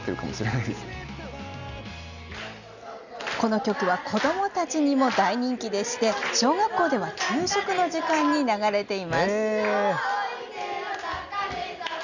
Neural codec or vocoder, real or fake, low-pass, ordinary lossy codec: none; real; 7.2 kHz; none